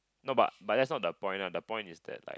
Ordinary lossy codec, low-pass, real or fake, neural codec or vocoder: none; none; real; none